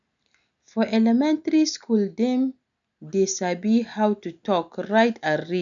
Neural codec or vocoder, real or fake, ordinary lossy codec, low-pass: none; real; none; 7.2 kHz